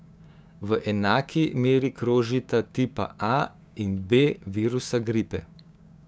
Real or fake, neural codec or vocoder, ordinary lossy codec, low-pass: fake; codec, 16 kHz, 6 kbps, DAC; none; none